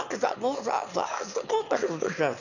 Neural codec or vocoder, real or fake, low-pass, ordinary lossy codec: autoencoder, 22.05 kHz, a latent of 192 numbers a frame, VITS, trained on one speaker; fake; 7.2 kHz; none